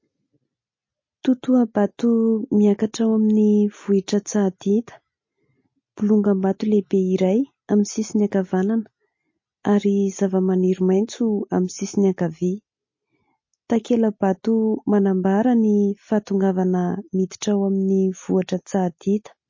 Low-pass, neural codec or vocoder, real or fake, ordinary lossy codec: 7.2 kHz; none; real; MP3, 32 kbps